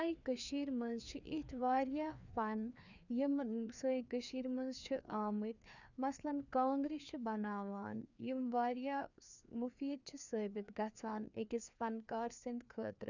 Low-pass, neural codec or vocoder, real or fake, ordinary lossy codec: 7.2 kHz; codec, 16 kHz, 2 kbps, FreqCodec, larger model; fake; none